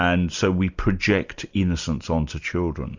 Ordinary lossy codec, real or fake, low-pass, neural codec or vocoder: Opus, 64 kbps; real; 7.2 kHz; none